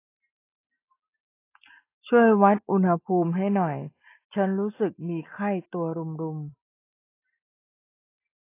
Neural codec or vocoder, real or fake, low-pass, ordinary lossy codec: none; real; 3.6 kHz; AAC, 24 kbps